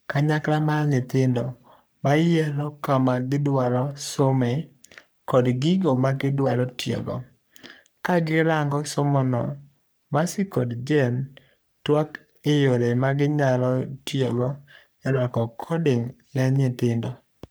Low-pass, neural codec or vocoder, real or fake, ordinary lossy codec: none; codec, 44.1 kHz, 3.4 kbps, Pupu-Codec; fake; none